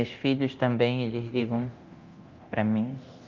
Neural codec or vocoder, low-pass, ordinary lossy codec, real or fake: codec, 24 kHz, 0.9 kbps, DualCodec; 7.2 kHz; Opus, 24 kbps; fake